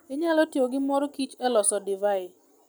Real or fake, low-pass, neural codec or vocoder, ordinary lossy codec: real; none; none; none